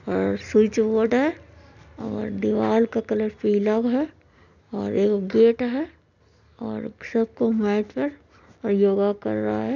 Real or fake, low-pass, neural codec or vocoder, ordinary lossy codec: real; 7.2 kHz; none; none